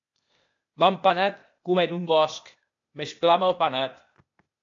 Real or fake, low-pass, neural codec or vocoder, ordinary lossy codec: fake; 7.2 kHz; codec, 16 kHz, 0.8 kbps, ZipCodec; AAC, 48 kbps